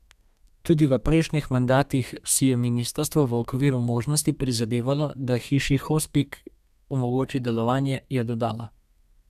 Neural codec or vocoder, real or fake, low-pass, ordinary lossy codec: codec, 32 kHz, 1.9 kbps, SNAC; fake; 14.4 kHz; none